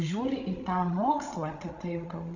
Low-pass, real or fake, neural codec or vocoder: 7.2 kHz; fake; codec, 16 kHz, 16 kbps, FreqCodec, larger model